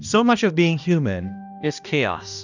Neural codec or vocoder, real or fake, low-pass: codec, 16 kHz, 1 kbps, X-Codec, HuBERT features, trained on balanced general audio; fake; 7.2 kHz